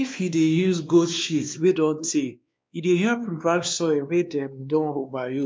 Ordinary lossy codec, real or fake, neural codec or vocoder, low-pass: none; fake; codec, 16 kHz, 2 kbps, X-Codec, WavLM features, trained on Multilingual LibriSpeech; none